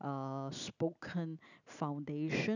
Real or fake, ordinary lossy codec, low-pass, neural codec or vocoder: real; none; 7.2 kHz; none